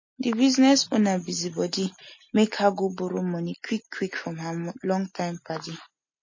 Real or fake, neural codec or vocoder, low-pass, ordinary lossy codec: real; none; 7.2 kHz; MP3, 32 kbps